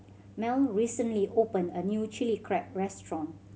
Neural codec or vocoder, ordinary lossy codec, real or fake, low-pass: none; none; real; none